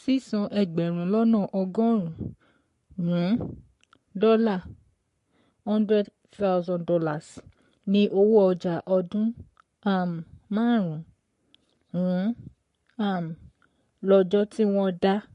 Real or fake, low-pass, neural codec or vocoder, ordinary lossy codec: fake; 14.4 kHz; codec, 44.1 kHz, 7.8 kbps, Pupu-Codec; MP3, 48 kbps